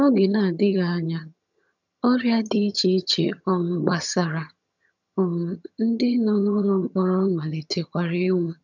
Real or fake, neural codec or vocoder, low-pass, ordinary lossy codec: fake; vocoder, 22.05 kHz, 80 mel bands, HiFi-GAN; 7.2 kHz; none